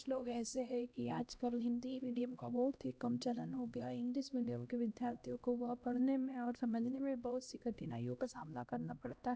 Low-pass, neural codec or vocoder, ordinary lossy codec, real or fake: none; codec, 16 kHz, 1 kbps, X-Codec, HuBERT features, trained on LibriSpeech; none; fake